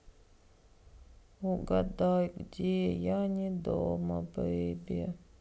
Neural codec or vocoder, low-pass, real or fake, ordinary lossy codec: none; none; real; none